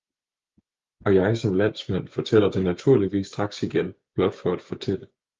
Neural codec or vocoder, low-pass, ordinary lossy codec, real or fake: codec, 16 kHz, 6 kbps, DAC; 7.2 kHz; Opus, 32 kbps; fake